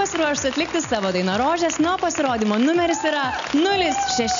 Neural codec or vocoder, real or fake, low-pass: none; real; 7.2 kHz